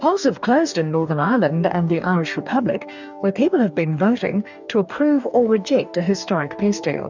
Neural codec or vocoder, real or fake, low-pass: codec, 44.1 kHz, 2.6 kbps, DAC; fake; 7.2 kHz